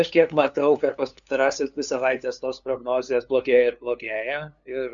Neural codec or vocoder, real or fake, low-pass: codec, 16 kHz, 2 kbps, FunCodec, trained on LibriTTS, 25 frames a second; fake; 7.2 kHz